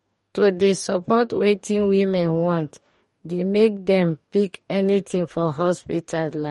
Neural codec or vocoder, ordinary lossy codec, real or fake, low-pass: codec, 44.1 kHz, 2.6 kbps, DAC; MP3, 48 kbps; fake; 19.8 kHz